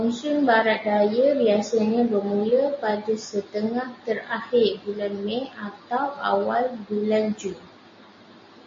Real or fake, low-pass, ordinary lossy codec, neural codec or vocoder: real; 7.2 kHz; MP3, 32 kbps; none